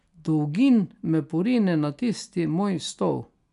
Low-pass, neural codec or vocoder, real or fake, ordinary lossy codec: 10.8 kHz; none; real; none